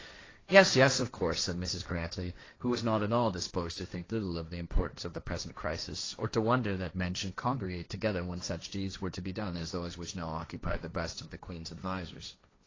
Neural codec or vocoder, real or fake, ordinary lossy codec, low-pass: codec, 16 kHz, 1.1 kbps, Voila-Tokenizer; fake; AAC, 32 kbps; 7.2 kHz